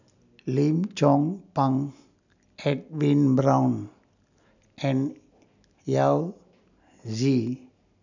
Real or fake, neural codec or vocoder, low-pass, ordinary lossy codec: real; none; 7.2 kHz; none